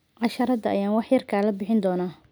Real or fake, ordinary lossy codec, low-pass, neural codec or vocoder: real; none; none; none